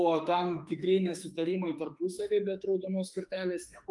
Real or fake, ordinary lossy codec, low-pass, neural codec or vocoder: fake; Opus, 32 kbps; 10.8 kHz; autoencoder, 48 kHz, 32 numbers a frame, DAC-VAE, trained on Japanese speech